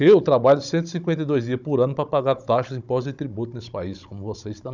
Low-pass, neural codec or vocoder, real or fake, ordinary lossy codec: 7.2 kHz; codec, 16 kHz, 16 kbps, FunCodec, trained on Chinese and English, 50 frames a second; fake; none